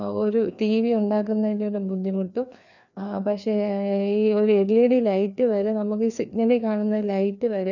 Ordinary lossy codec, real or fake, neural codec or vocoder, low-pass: none; fake; codec, 16 kHz, 2 kbps, FreqCodec, larger model; 7.2 kHz